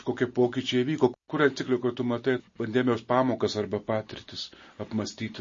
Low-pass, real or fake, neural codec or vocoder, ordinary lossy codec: 7.2 kHz; real; none; MP3, 32 kbps